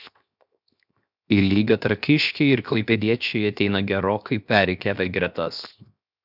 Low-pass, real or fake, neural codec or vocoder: 5.4 kHz; fake; codec, 16 kHz, 0.7 kbps, FocalCodec